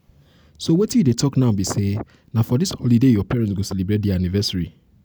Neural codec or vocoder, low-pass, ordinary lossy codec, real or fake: none; none; none; real